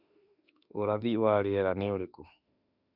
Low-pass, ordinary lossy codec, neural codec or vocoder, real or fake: 5.4 kHz; none; autoencoder, 48 kHz, 32 numbers a frame, DAC-VAE, trained on Japanese speech; fake